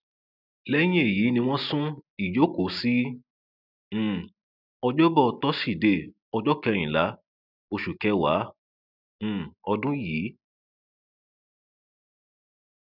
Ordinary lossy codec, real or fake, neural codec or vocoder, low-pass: none; real; none; 5.4 kHz